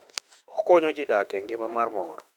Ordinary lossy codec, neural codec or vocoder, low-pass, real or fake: none; autoencoder, 48 kHz, 32 numbers a frame, DAC-VAE, trained on Japanese speech; 19.8 kHz; fake